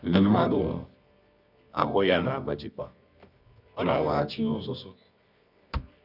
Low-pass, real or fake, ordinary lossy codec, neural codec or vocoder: 5.4 kHz; fake; none; codec, 24 kHz, 0.9 kbps, WavTokenizer, medium music audio release